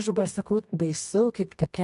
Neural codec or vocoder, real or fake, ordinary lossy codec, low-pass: codec, 24 kHz, 0.9 kbps, WavTokenizer, medium music audio release; fake; AAC, 64 kbps; 10.8 kHz